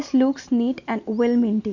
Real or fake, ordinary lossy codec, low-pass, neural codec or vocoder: real; none; 7.2 kHz; none